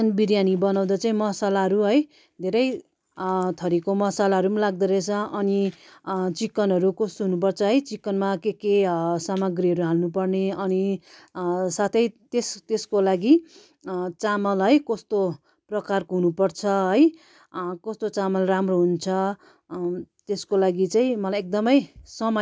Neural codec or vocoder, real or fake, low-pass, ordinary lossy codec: none; real; none; none